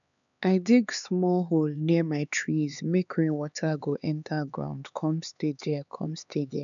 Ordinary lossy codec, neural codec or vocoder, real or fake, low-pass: none; codec, 16 kHz, 4 kbps, X-Codec, HuBERT features, trained on LibriSpeech; fake; 7.2 kHz